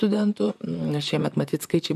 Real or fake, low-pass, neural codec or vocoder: fake; 14.4 kHz; vocoder, 44.1 kHz, 128 mel bands, Pupu-Vocoder